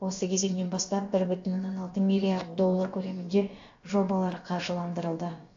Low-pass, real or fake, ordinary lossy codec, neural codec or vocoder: 7.2 kHz; fake; AAC, 48 kbps; codec, 16 kHz, about 1 kbps, DyCAST, with the encoder's durations